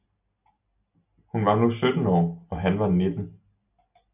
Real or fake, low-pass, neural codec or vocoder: real; 3.6 kHz; none